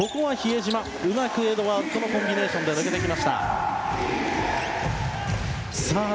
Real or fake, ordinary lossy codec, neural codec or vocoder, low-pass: real; none; none; none